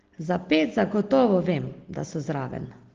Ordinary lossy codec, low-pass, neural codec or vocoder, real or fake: Opus, 16 kbps; 7.2 kHz; none; real